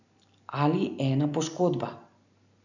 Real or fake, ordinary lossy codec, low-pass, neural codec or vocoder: real; none; 7.2 kHz; none